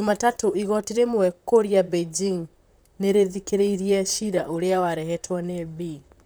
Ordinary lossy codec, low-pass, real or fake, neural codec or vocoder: none; none; fake; vocoder, 44.1 kHz, 128 mel bands, Pupu-Vocoder